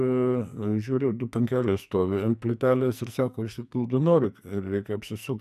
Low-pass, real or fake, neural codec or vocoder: 14.4 kHz; fake; codec, 44.1 kHz, 2.6 kbps, SNAC